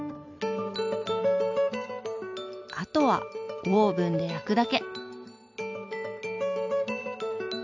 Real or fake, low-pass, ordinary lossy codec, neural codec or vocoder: real; 7.2 kHz; AAC, 48 kbps; none